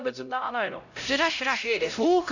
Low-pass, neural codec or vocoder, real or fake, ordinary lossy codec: 7.2 kHz; codec, 16 kHz, 0.5 kbps, X-Codec, HuBERT features, trained on LibriSpeech; fake; none